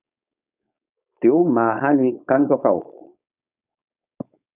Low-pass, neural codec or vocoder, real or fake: 3.6 kHz; codec, 16 kHz, 4.8 kbps, FACodec; fake